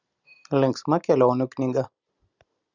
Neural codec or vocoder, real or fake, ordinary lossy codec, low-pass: none; real; Opus, 64 kbps; 7.2 kHz